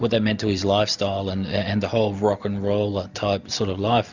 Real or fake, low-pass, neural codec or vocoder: real; 7.2 kHz; none